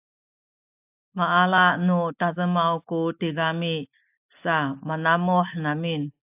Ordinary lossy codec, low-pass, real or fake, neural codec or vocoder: AAC, 32 kbps; 3.6 kHz; real; none